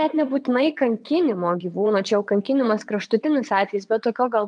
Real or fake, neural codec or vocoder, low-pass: fake; vocoder, 22.05 kHz, 80 mel bands, WaveNeXt; 9.9 kHz